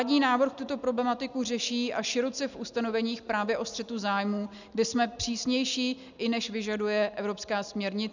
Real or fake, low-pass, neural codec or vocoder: real; 7.2 kHz; none